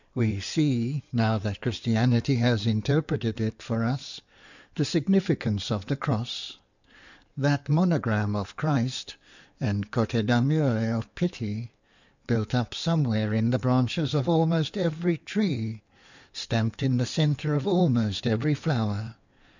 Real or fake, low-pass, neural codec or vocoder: fake; 7.2 kHz; codec, 16 kHz in and 24 kHz out, 2.2 kbps, FireRedTTS-2 codec